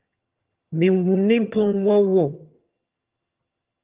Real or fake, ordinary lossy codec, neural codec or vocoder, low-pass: fake; Opus, 32 kbps; vocoder, 22.05 kHz, 80 mel bands, HiFi-GAN; 3.6 kHz